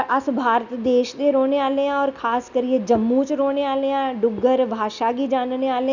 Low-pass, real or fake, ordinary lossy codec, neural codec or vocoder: 7.2 kHz; real; none; none